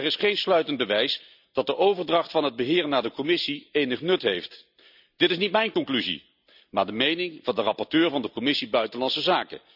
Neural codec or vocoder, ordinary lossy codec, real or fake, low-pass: none; none; real; 5.4 kHz